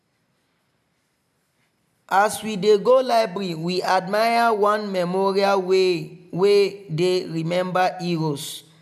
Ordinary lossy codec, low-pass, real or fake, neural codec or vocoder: none; 14.4 kHz; real; none